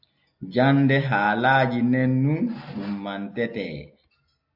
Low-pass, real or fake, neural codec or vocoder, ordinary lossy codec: 5.4 kHz; real; none; AAC, 48 kbps